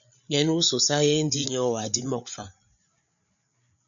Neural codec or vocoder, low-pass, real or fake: codec, 16 kHz, 8 kbps, FreqCodec, larger model; 7.2 kHz; fake